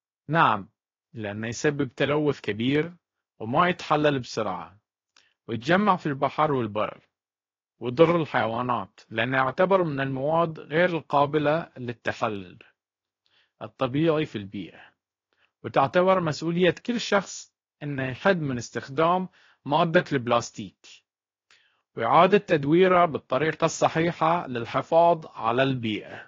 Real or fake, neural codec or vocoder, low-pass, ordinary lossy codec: fake; codec, 16 kHz, 0.7 kbps, FocalCodec; 7.2 kHz; AAC, 32 kbps